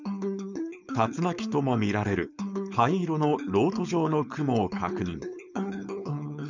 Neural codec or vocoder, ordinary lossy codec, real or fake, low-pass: codec, 16 kHz, 4.8 kbps, FACodec; none; fake; 7.2 kHz